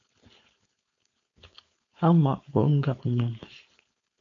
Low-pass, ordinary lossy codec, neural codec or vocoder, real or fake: 7.2 kHz; AAC, 48 kbps; codec, 16 kHz, 4.8 kbps, FACodec; fake